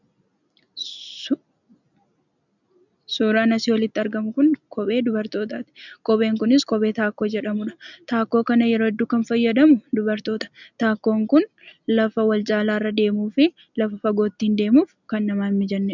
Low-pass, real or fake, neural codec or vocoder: 7.2 kHz; real; none